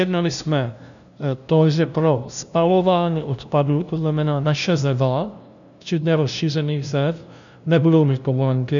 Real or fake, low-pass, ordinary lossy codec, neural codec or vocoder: fake; 7.2 kHz; MP3, 96 kbps; codec, 16 kHz, 0.5 kbps, FunCodec, trained on LibriTTS, 25 frames a second